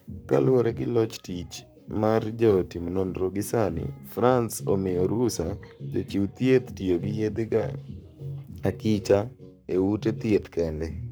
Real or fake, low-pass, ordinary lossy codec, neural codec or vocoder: fake; none; none; codec, 44.1 kHz, 7.8 kbps, Pupu-Codec